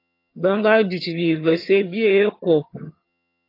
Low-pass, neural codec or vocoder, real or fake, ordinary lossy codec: 5.4 kHz; vocoder, 22.05 kHz, 80 mel bands, HiFi-GAN; fake; AAC, 32 kbps